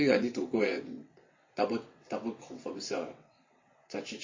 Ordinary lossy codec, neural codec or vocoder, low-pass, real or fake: MP3, 32 kbps; vocoder, 22.05 kHz, 80 mel bands, WaveNeXt; 7.2 kHz; fake